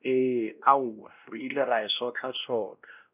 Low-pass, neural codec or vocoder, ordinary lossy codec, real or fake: 3.6 kHz; codec, 16 kHz, 1 kbps, X-Codec, WavLM features, trained on Multilingual LibriSpeech; none; fake